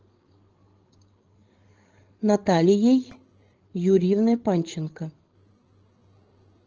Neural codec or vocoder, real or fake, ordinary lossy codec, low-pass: codec, 16 kHz, 16 kbps, FreqCodec, larger model; fake; Opus, 16 kbps; 7.2 kHz